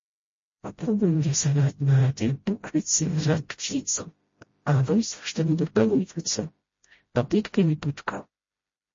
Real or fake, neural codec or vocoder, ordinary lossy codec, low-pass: fake; codec, 16 kHz, 0.5 kbps, FreqCodec, smaller model; MP3, 32 kbps; 7.2 kHz